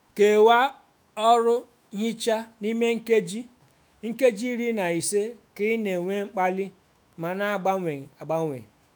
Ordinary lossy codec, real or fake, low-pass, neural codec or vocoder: none; fake; none; autoencoder, 48 kHz, 128 numbers a frame, DAC-VAE, trained on Japanese speech